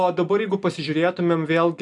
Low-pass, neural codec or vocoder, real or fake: 10.8 kHz; none; real